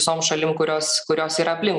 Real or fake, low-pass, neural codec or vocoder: real; 10.8 kHz; none